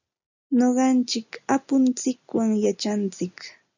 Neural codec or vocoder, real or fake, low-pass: none; real; 7.2 kHz